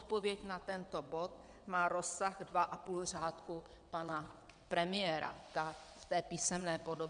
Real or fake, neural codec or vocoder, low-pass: fake; vocoder, 22.05 kHz, 80 mel bands, WaveNeXt; 9.9 kHz